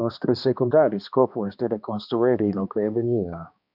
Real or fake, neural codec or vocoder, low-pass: fake; codec, 16 kHz, 2 kbps, X-Codec, HuBERT features, trained on balanced general audio; 5.4 kHz